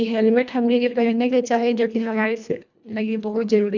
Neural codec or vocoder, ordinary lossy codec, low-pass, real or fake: codec, 24 kHz, 1.5 kbps, HILCodec; none; 7.2 kHz; fake